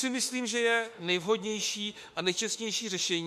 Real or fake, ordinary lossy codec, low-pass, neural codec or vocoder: fake; MP3, 64 kbps; 14.4 kHz; autoencoder, 48 kHz, 32 numbers a frame, DAC-VAE, trained on Japanese speech